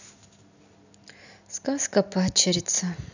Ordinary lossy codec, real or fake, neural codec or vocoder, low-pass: none; real; none; 7.2 kHz